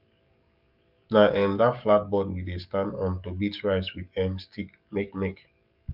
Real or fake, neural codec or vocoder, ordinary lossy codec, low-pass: fake; codec, 44.1 kHz, 7.8 kbps, DAC; none; 5.4 kHz